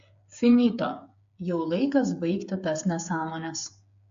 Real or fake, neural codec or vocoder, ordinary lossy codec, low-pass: fake; codec, 16 kHz, 8 kbps, FreqCodec, smaller model; AAC, 64 kbps; 7.2 kHz